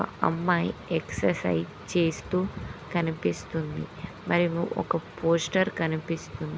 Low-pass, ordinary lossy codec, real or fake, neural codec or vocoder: none; none; real; none